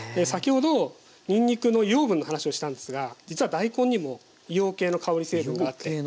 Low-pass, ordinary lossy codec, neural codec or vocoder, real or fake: none; none; none; real